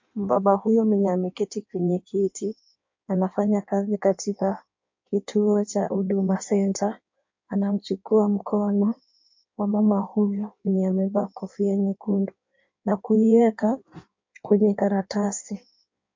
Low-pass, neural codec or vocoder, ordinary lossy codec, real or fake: 7.2 kHz; codec, 16 kHz in and 24 kHz out, 1.1 kbps, FireRedTTS-2 codec; MP3, 48 kbps; fake